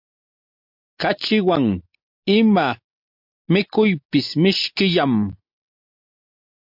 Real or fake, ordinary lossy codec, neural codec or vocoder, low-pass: real; MP3, 48 kbps; none; 5.4 kHz